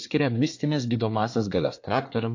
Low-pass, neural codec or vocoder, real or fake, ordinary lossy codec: 7.2 kHz; codec, 24 kHz, 1 kbps, SNAC; fake; AAC, 48 kbps